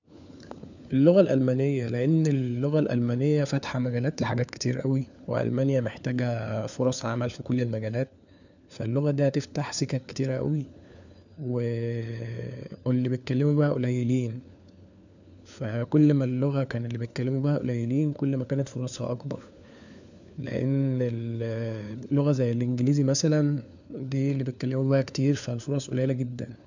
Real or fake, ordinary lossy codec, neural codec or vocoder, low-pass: fake; none; codec, 16 kHz, 4 kbps, FunCodec, trained on LibriTTS, 50 frames a second; 7.2 kHz